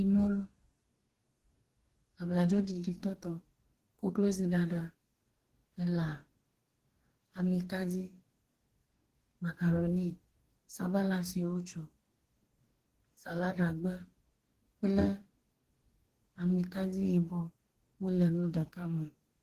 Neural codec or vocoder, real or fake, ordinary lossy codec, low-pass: codec, 44.1 kHz, 2.6 kbps, DAC; fake; Opus, 16 kbps; 14.4 kHz